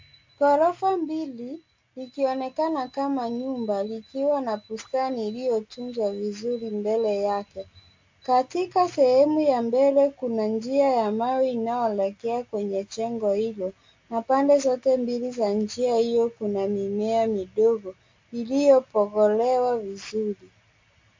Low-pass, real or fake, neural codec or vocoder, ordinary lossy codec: 7.2 kHz; real; none; MP3, 48 kbps